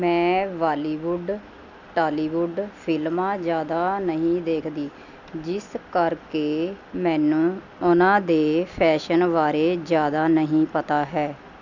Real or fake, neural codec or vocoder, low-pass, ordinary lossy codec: real; none; 7.2 kHz; none